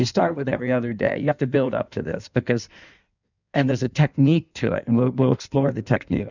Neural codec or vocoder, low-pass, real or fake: codec, 16 kHz in and 24 kHz out, 1.1 kbps, FireRedTTS-2 codec; 7.2 kHz; fake